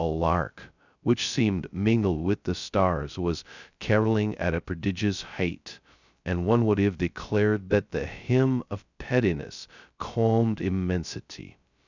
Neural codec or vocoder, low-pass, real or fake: codec, 16 kHz, 0.2 kbps, FocalCodec; 7.2 kHz; fake